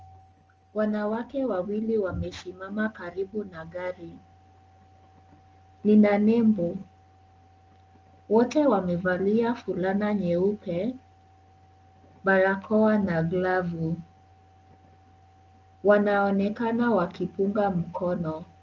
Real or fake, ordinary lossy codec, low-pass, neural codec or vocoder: real; Opus, 24 kbps; 7.2 kHz; none